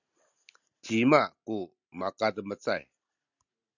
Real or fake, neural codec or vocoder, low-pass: real; none; 7.2 kHz